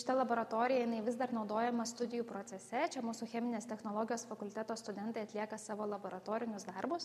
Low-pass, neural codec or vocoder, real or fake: 14.4 kHz; none; real